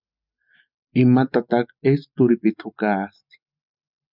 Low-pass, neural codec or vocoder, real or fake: 5.4 kHz; none; real